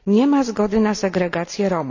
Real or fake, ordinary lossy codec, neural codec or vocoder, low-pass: real; none; none; 7.2 kHz